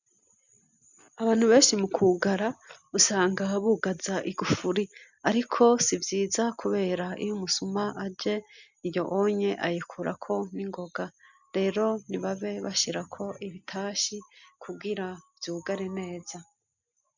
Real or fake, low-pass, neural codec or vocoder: real; 7.2 kHz; none